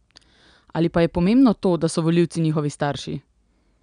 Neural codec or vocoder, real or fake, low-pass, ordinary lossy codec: none; real; 9.9 kHz; none